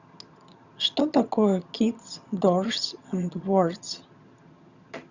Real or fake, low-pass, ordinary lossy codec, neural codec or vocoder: fake; 7.2 kHz; Opus, 64 kbps; vocoder, 22.05 kHz, 80 mel bands, HiFi-GAN